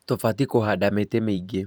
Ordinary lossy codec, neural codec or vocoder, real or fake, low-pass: none; vocoder, 44.1 kHz, 128 mel bands every 512 samples, BigVGAN v2; fake; none